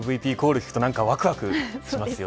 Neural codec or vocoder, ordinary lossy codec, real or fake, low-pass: none; none; real; none